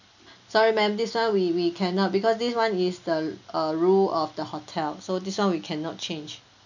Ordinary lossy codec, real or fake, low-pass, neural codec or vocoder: none; real; 7.2 kHz; none